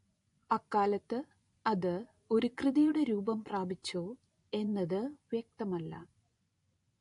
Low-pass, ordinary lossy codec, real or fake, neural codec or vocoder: 10.8 kHz; AAC, 48 kbps; fake; vocoder, 24 kHz, 100 mel bands, Vocos